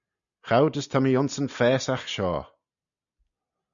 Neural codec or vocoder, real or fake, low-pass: none; real; 7.2 kHz